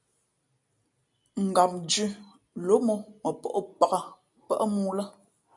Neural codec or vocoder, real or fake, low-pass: none; real; 10.8 kHz